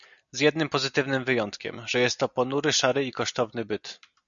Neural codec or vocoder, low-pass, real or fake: none; 7.2 kHz; real